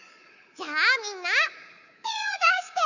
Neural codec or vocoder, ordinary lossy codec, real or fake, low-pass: codec, 24 kHz, 3.1 kbps, DualCodec; none; fake; 7.2 kHz